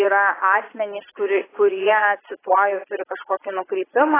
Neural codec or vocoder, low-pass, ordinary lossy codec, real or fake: vocoder, 22.05 kHz, 80 mel bands, Vocos; 3.6 kHz; AAC, 16 kbps; fake